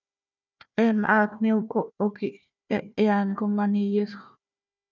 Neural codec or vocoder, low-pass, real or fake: codec, 16 kHz, 1 kbps, FunCodec, trained on Chinese and English, 50 frames a second; 7.2 kHz; fake